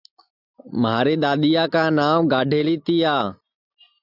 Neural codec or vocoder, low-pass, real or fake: none; 5.4 kHz; real